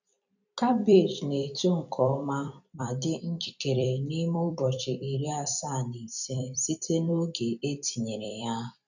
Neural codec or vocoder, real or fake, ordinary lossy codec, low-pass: vocoder, 44.1 kHz, 128 mel bands every 256 samples, BigVGAN v2; fake; none; 7.2 kHz